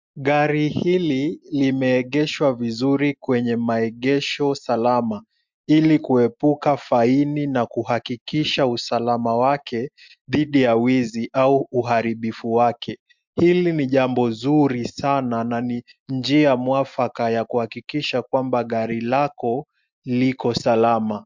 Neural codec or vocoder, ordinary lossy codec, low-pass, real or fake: none; MP3, 64 kbps; 7.2 kHz; real